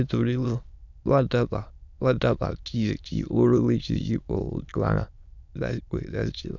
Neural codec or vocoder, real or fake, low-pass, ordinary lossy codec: autoencoder, 22.05 kHz, a latent of 192 numbers a frame, VITS, trained on many speakers; fake; 7.2 kHz; none